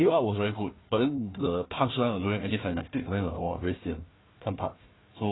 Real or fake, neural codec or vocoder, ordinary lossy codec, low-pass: fake; codec, 16 kHz, 1 kbps, FunCodec, trained on Chinese and English, 50 frames a second; AAC, 16 kbps; 7.2 kHz